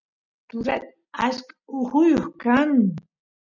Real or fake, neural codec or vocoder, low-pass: real; none; 7.2 kHz